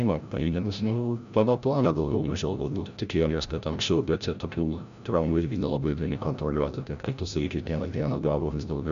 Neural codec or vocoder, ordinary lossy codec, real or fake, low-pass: codec, 16 kHz, 0.5 kbps, FreqCodec, larger model; AAC, 96 kbps; fake; 7.2 kHz